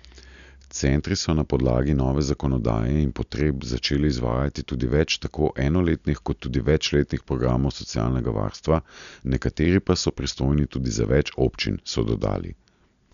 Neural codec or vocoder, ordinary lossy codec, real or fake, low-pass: none; none; real; 7.2 kHz